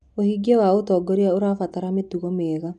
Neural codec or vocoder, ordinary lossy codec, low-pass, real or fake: none; none; 10.8 kHz; real